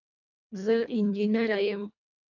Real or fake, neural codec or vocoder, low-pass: fake; codec, 24 kHz, 1.5 kbps, HILCodec; 7.2 kHz